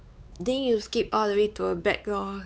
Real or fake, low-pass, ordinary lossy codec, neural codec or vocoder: fake; none; none; codec, 16 kHz, 4 kbps, X-Codec, WavLM features, trained on Multilingual LibriSpeech